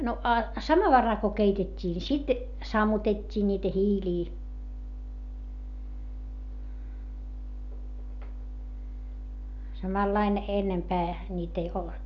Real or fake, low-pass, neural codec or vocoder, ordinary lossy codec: real; 7.2 kHz; none; none